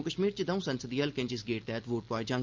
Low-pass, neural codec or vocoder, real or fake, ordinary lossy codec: 7.2 kHz; none; real; Opus, 24 kbps